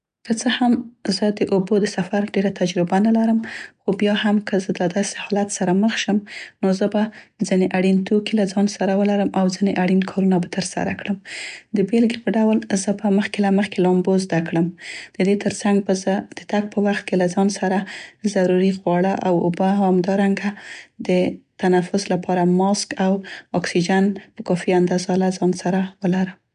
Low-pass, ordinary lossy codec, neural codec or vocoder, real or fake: 9.9 kHz; none; none; real